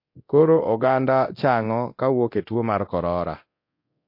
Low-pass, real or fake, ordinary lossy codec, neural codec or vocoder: 5.4 kHz; fake; MP3, 32 kbps; codec, 24 kHz, 0.9 kbps, DualCodec